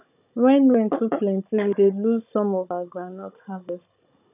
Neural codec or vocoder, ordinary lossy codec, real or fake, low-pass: codec, 16 kHz, 16 kbps, FunCodec, trained on Chinese and English, 50 frames a second; none; fake; 3.6 kHz